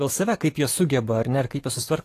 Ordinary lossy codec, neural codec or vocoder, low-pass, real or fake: AAC, 48 kbps; codec, 44.1 kHz, 7.8 kbps, DAC; 14.4 kHz; fake